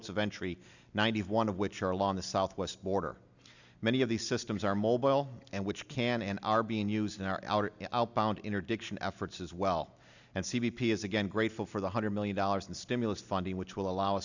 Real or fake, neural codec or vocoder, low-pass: real; none; 7.2 kHz